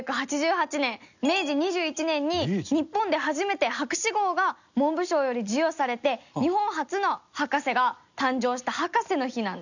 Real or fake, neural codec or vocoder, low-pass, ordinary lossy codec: real; none; 7.2 kHz; none